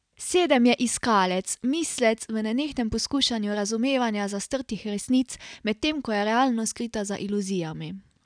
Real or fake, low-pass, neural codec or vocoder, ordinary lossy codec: real; 9.9 kHz; none; none